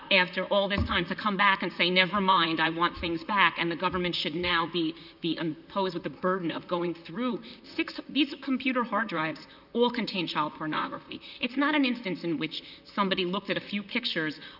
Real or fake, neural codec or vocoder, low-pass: fake; vocoder, 44.1 kHz, 128 mel bands, Pupu-Vocoder; 5.4 kHz